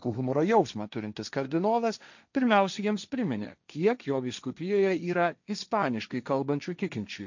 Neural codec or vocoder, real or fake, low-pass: codec, 16 kHz, 1.1 kbps, Voila-Tokenizer; fake; 7.2 kHz